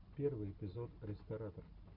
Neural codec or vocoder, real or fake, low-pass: none; real; 5.4 kHz